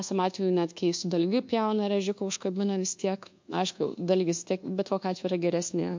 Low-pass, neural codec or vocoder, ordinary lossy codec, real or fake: 7.2 kHz; codec, 24 kHz, 1.2 kbps, DualCodec; MP3, 48 kbps; fake